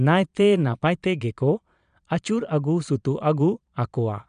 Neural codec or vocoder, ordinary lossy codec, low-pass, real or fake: vocoder, 22.05 kHz, 80 mel bands, Vocos; none; 9.9 kHz; fake